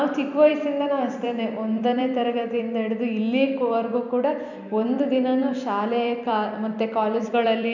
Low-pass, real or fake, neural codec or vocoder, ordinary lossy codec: 7.2 kHz; fake; vocoder, 44.1 kHz, 128 mel bands every 256 samples, BigVGAN v2; none